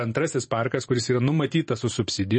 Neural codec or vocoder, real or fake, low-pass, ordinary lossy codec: vocoder, 44.1 kHz, 128 mel bands every 512 samples, BigVGAN v2; fake; 10.8 kHz; MP3, 32 kbps